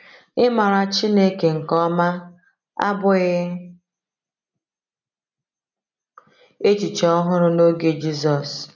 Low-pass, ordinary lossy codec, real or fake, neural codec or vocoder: 7.2 kHz; none; real; none